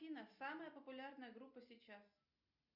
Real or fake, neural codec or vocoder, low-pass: real; none; 5.4 kHz